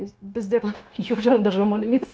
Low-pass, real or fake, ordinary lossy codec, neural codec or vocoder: none; fake; none; codec, 16 kHz, 0.9 kbps, LongCat-Audio-Codec